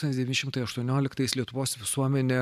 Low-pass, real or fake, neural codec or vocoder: 14.4 kHz; fake; vocoder, 44.1 kHz, 128 mel bands every 512 samples, BigVGAN v2